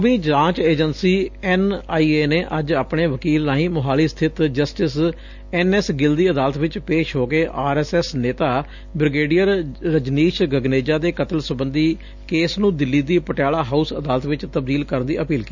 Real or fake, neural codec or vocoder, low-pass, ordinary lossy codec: real; none; 7.2 kHz; none